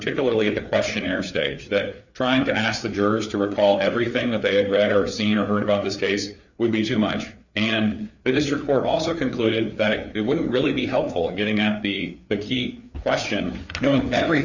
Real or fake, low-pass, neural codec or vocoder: fake; 7.2 kHz; codec, 16 kHz, 4 kbps, FreqCodec, larger model